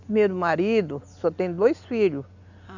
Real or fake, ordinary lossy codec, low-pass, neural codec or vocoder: real; none; 7.2 kHz; none